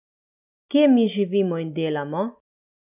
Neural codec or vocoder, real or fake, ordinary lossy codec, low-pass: none; real; none; 3.6 kHz